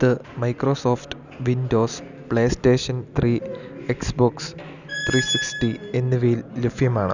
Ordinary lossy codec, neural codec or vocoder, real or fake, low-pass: none; none; real; 7.2 kHz